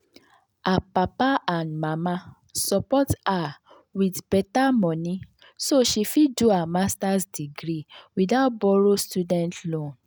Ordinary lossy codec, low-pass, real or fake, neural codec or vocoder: none; none; real; none